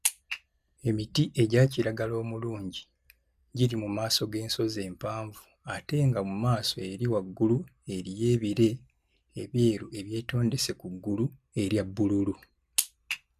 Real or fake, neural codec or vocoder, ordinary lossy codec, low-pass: real; none; none; 14.4 kHz